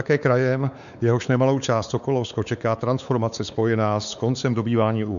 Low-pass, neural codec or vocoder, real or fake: 7.2 kHz; codec, 16 kHz, 4 kbps, X-Codec, WavLM features, trained on Multilingual LibriSpeech; fake